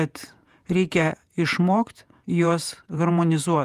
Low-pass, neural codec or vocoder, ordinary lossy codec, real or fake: 14.4 kHz; vocoder, 48 kHz, 128 mel bands, Vocos; Opus, 32 kbps; fake